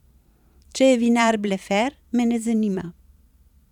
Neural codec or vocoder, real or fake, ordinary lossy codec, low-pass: vocoder, 44.1 kHz, 128 mel bands every 512 samples, BigVGAN v2; fake; none; 19.8 kHz